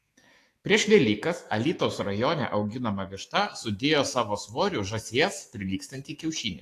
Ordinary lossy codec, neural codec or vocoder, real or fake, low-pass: AAC, 48 kbps; autoencoder, 48 kHz, 128 numbers a frame, DAC-VAE, trained on Japanese speech; fake; 14.4 kHz